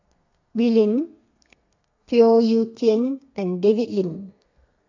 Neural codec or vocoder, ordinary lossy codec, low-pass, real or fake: codec, 32 kHz, 1.9 kbps, SNAC; AAC, 48 kbps; 7.2 kHz; fake